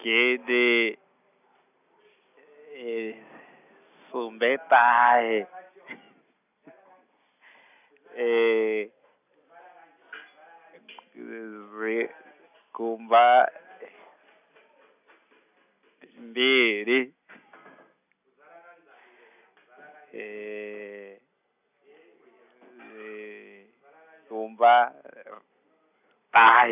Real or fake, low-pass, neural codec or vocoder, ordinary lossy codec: real; 3.6 kHz; none; none